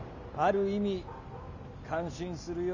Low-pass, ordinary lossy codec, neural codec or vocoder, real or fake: 7.2 kHz; none; none; real